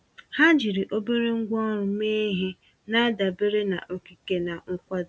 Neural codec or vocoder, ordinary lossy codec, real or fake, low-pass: none; none; real; none